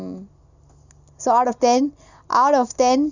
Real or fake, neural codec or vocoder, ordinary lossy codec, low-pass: real; none; none; 7.2 kHz